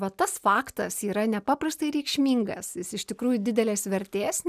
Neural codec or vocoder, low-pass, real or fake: none; 14.4 kHz; real